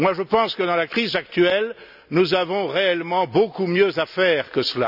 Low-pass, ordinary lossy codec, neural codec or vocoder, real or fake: 5.4 kHz; none; none; real